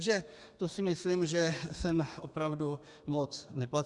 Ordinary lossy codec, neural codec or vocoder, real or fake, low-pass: Opus, 64 kbps; codec, 32 kHz, 1.9 kbps, SNAC; fake; 10.8 kHz